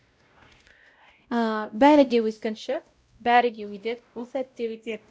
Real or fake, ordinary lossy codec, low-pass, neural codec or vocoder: fake; none; none; codec, 16 kHz, 0.5 kbps, X-Codec, WavLM features, trained on Multilingual LibriSpeech